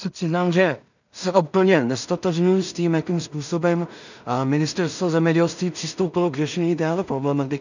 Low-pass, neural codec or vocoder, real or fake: 7.2 kHz; codec, 16 kHz in and 24 kHz out, 0.4 kbps, LongCat-Audio-Codec, two codebook decoder; fake